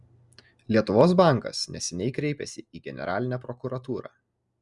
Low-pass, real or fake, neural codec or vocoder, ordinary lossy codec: 10.8 kHz; real; none; Opus, 64 kbps